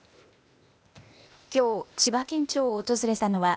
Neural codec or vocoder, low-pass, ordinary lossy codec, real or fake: codec, 16 kHz, 0.8 kbps, ZipCodec; none; none; fake